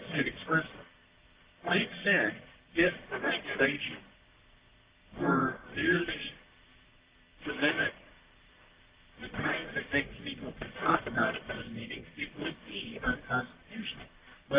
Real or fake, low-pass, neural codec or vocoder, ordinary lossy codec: fake; 3.6 kHz; codec, 44.1 kHz, 1.7 kbps, Pupu-Codec; Opus, 24 kbps